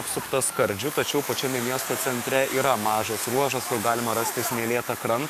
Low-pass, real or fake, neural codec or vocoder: 14.4 kHz; fake; codec, 44.1 kHz, 7.8 kbps, DAC